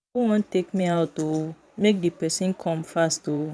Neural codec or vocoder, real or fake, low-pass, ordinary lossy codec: vocoder, 22.05 kHz, 80 mel bands, Vocos; fake; none; none